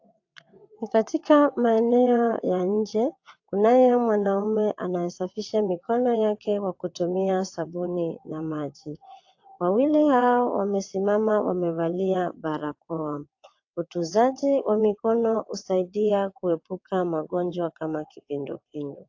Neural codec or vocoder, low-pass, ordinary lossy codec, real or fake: vocoder, 22.05 kHz, 80 mel bands, WaveNeXt; 7.2 kHz; AAC, 48 kbps; fake